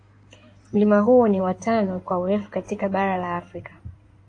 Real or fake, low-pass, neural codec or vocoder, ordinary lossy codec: fake; 9.9 kHz; codec, 16 kHz in and 24 kHz out, 2.2 kbps, FireRedTTS-2 codec; AAC, 48 kbps